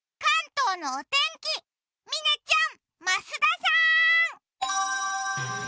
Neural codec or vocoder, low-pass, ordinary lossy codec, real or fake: none; none; none; real